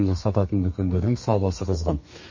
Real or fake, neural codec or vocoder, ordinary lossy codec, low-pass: fake; codec, 32 kHz, 1.9 kbps, SNAC; MP3, 32 kbps; 7.2 kHz